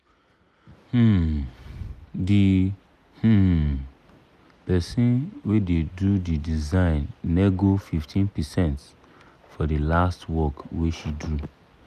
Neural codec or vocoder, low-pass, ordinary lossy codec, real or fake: none; 14.4 kHz; none; real